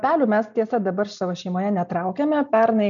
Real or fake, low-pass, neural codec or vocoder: real; 7.2 kHz; none